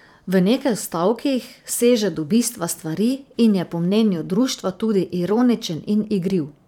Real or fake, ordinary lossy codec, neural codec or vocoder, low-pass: fake; none; vocoder, 44.1 kHz, 128 mel bands every 512 samples, BigVGAN v2; 19.8 kHz